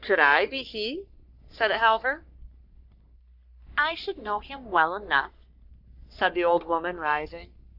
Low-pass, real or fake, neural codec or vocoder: 5.4 kHz; fake; codec, 44.1 kHz, 3.4 kbps, Pupu-Codec